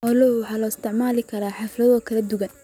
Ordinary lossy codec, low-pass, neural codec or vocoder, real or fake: none; 19.8 kHz; none; real